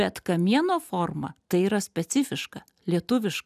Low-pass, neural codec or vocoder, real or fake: 14.4 kHz; none; real